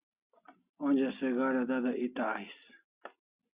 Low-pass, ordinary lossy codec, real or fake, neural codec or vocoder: 3.6 kHz; Opus, 64 kbps; real; none